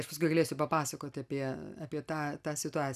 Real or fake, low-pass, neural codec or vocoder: real; 14.4 kHz; none